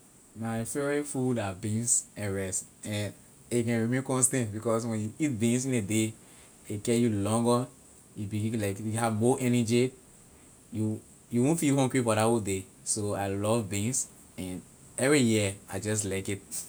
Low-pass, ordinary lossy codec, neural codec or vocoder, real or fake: none; none; none; real